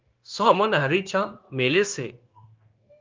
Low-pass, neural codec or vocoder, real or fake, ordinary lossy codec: 7.2 kHz; codec, 16 kHz, 0.9 kbps, LongCat-Audio-Codec; fake; Opus, 32 kbps